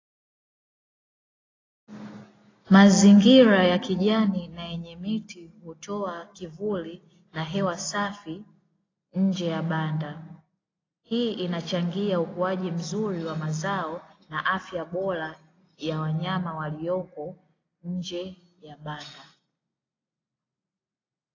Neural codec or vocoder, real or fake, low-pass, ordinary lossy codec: none; real; 7.2 kHz; AAC, 32 kbps